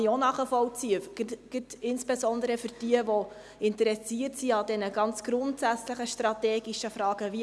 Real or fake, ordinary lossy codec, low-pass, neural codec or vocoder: real; none; none; none